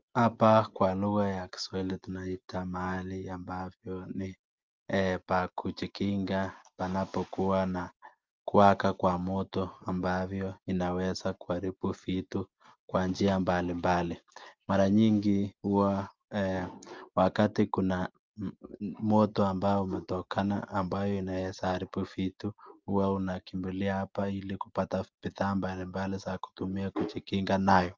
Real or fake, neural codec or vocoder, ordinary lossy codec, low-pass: real; none; Opus, 32 kbps; 7.2 kHz